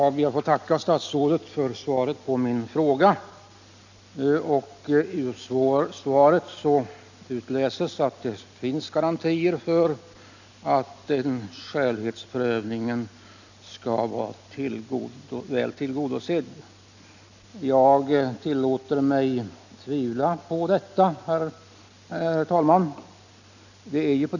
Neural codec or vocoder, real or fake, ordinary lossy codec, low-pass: none; real; none; 7.2 kHz